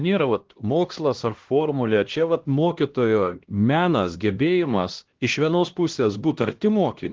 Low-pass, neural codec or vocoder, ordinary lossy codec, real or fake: 7.2 kHz; codec, 16 kHz, 1 kbps, X-Codec, HuBERT features, trained on LibriSpeech; Opus, 16 kbps; fake